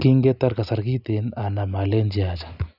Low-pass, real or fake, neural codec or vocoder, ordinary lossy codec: 5.4 kHz; real; none; none